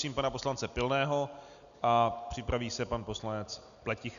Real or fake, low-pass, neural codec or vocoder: real; 7.2 kHz; none